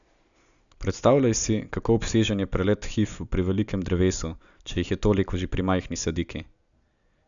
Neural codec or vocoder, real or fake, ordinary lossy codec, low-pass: none; real; none; 7.2 kHz